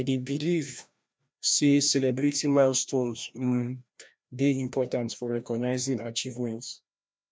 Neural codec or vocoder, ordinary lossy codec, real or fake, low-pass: codec, 16 kHz, 1 kbps, FreqCodec, larger model; none; fake; none